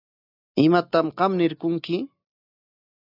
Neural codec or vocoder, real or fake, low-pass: none; real; 5.4 kHz